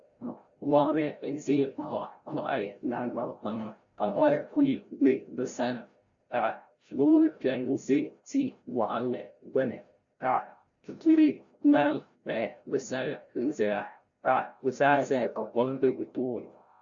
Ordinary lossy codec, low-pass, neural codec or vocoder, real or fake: Opus, 64 kbps; 7.2 kHz; codec, 16 kHz, 0.5 kbps, FreqCodec, larger model; fake